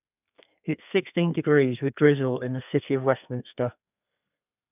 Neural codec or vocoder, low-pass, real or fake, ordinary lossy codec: codec, 32 kHz, 1.9 kbps, SNAC; 3.6 kHz; fake; none